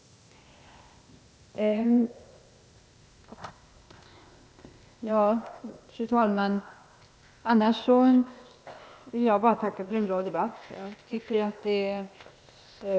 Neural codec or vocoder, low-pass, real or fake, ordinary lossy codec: codec, 16 kHz, 0.8 kbps, ZipCodec; none; fake; none